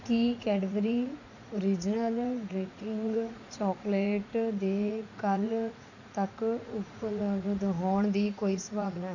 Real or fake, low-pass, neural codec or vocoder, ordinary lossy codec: fake; 7.2 kHz; vocoder, 44.1 kHz, 80 mel bands, Vocos; none